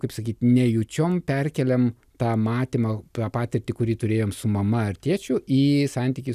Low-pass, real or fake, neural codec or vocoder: 14.4 kHz; real; none